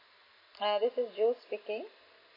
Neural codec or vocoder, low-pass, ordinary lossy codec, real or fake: none; 5.4 kHz; MP3, 24 kbps; real